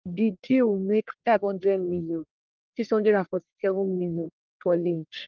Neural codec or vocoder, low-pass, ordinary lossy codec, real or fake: codec, 44.1 kHz, 1.7 kbps, Pupu-Codec; 7.2 kHz; Opus, 24 kbps; fake